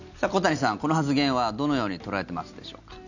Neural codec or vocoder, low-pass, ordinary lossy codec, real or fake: none; 7.2 kHz; none; real